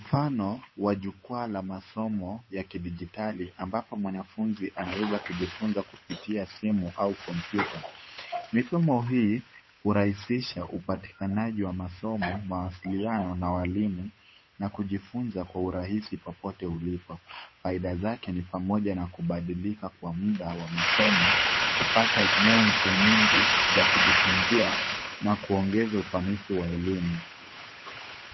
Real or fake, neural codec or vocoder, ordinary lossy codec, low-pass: fake; codec, 16 kHz, 8 kbps, FunCodec, trained on Chinese and English, 25 frames a second; MP3, 24 kbps; 7.2 kHz